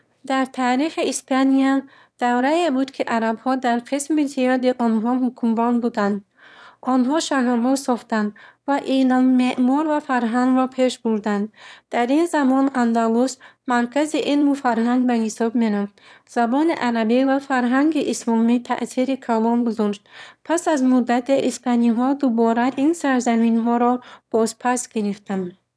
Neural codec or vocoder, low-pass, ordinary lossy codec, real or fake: autoencoder, 22.05 kHz, a latent of 192 numbers a frame, VITS, trained on one speaker; none; none; fake